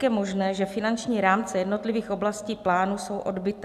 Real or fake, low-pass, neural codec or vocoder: real; 14.4 kHz; none